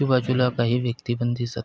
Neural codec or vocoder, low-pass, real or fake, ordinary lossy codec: none; none; real; none